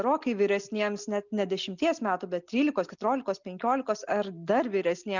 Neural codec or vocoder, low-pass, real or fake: none; 7.2 kHz; real